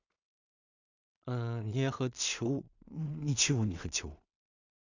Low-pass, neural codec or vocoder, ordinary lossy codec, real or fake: 7.2 kHz; codec, 16 kHz in and 24 kHz out, 0.4 kbps, LongCat-Audio-Codec, two codebook decoder; none; fake